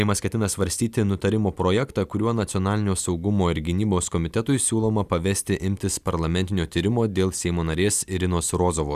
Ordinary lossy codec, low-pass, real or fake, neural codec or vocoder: Opus, 64 kbps; 14.4 kHz; real; none